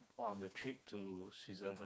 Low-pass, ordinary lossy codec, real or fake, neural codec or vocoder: none; none; fake; codec, 16 kHz, 2 kbps, FreqCodec, smaller model